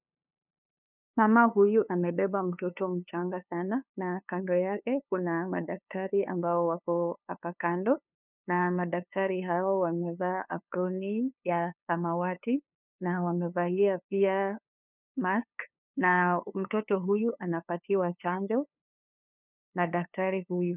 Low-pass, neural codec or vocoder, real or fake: 3.6 kHz; codec, 16 kHz, 2 kbps, FunCodec, trained on LibriTTS, 25 frames a second; fake